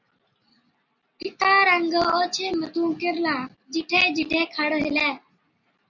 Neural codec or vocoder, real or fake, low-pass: none; real; 7.2 kHz